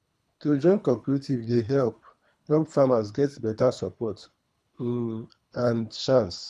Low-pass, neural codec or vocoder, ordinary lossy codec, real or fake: none; codec, 24 kHz, 3 kbps, HILCodec; none; fake